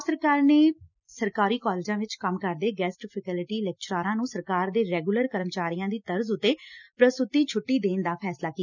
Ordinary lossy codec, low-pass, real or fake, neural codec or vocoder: none; 7.2 kHz; real; none